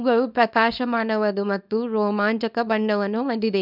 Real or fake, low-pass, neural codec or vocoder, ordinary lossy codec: fake; 5.4 kHz; codec, 24 kHz, 0.9 kbps, WavTokenizer, small release; none